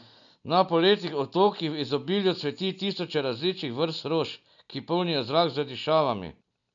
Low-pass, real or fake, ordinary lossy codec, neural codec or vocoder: 7.2 kHz; real; none; none